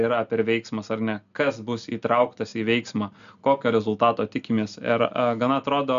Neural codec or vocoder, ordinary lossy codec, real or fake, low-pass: none; MP3, 96 kbps; real; 7.2 kHz